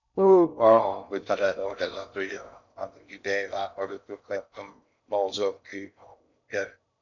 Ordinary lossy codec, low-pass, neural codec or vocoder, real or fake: none; 7.2 kHz; codec, 16 kHz in and 24 kHz out, 0.6 kbps, FocalCodec, streaming, 4096 codes; fake